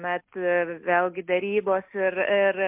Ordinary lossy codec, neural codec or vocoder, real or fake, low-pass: MP3, 32 kbps; none; real; 3.6 kHz